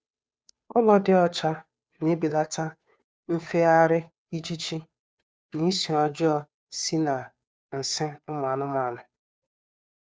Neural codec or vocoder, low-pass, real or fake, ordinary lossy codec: codec, 16 kHz, 2 kbps, FunCodec, trained on Chinese and English, 25 frames a second; none; fake; none